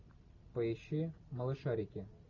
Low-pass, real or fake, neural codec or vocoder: 7.2 kHz; real; none